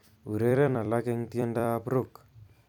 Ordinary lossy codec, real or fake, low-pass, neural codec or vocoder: none; fake; 19.8 kHz; vocoder, 44.1 kHz, 128 mel bands every 256 samples, BigVGAN v2